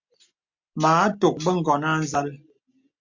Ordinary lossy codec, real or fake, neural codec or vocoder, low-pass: MP3, 48 kbps; real; none; 7.2 kHz